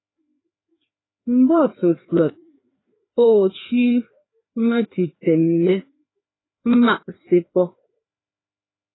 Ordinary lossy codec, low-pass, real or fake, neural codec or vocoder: AAC, 16 kbps; 7.2 kHz; fake; codec, 16 kHz, 2 kbps, FreqCodec, larger model